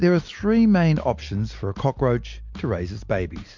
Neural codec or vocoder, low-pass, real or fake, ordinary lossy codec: none; 7.2 kHz; real; MP3, 64 kbps